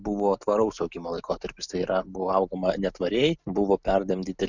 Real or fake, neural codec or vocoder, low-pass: real; none; 7.2 kHz